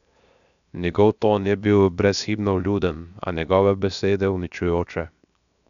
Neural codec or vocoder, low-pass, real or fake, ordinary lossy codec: codec, 16 kHz, 0.7 kbps, FocalCodec; 7.2 kHz; fake; none